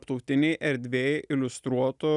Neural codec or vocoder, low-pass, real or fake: none; 10.8 kHz; real